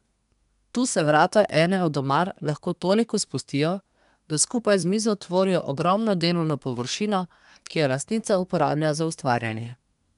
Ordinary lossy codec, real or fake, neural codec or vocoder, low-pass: none; fake; codec, 24 kHz, 1 kbps, SNAC; 10.8 kHz